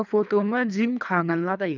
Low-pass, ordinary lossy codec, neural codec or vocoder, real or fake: 7.2 kHz; none; codec, 24 kHz, 3 kbps, HILCodec; fake